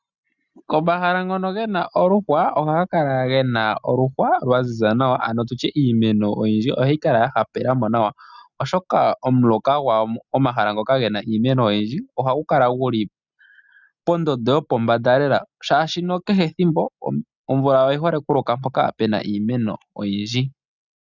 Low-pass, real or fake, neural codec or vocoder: 7.2 kHz; real; none